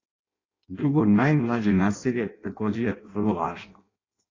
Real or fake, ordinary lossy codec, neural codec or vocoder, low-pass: fake; AAC, 32 kbps; codec, 16 kHz in and 24 kHz out, 0.6 kbps, FireRedTTS-2 codec; 7.2 kHz